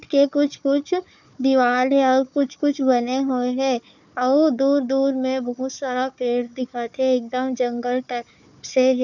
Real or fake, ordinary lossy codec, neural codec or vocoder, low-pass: fake; none; codec, 16 kHz, 4 kbps, FunCodec, trained on Chinese and English, 50 frames a second; 7.2 kHz